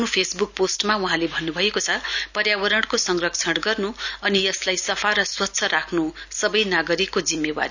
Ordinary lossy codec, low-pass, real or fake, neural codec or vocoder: none; 7.2 kHz; real; none